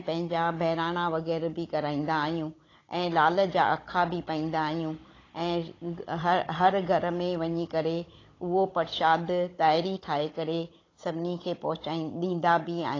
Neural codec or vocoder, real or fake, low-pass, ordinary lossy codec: codec, 16 kHz, 16 kbps, FunCodec, trained on Chinese and English, 50 frames a second; fake; 7.2 kHz; AAC, 32 kbps